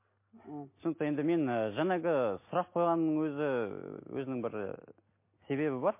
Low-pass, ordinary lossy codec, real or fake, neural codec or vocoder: 3.6 kHz; MP3, 24 kbps; real; none